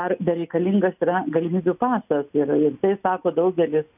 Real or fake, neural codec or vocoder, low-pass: real; none; 3.6 kHz